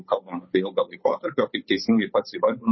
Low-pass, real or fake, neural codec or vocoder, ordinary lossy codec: 7.2 kHz; fake; codec, 16 kHz, 8 kbps, FunCodec, trained on Chinese and English, 25 frames a second; MP3, 24 kbps